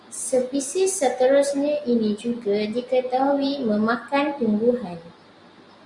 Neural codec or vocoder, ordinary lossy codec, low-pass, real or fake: none; Opus, 64 kbps; 10.8 kHz; real